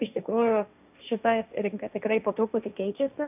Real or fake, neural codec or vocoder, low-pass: fake; codec, 16 kHz, 1.1 kbps, Voila-Tokenizer; 3.6 kHz